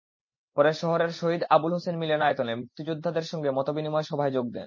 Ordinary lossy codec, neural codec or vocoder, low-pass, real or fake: MP3, 32 kbps; none; 7.2 kHz; real